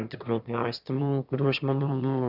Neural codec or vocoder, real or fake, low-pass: autoencoder, 22.05 kHz, a latent of 192 numbers a frame, VITS, trained on one speaker; fake; 5.4 kHz